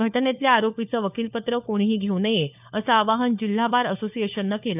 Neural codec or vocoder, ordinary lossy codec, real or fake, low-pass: codec, 16 kHz, 4 kbps, FunCodec, trained on LibriTTS, 50 frames a second; none; fake; 3.6 kHz